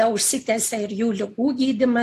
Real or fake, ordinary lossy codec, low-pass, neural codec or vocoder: real; AAC, 64 kbps; 14.4 kHz; none